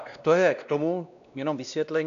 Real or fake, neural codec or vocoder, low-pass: fake; codec, 16 kHz, 1 kbps, X-Codec, WavLM features, trained on Multilingual LibriSpeech; 7.2 kHz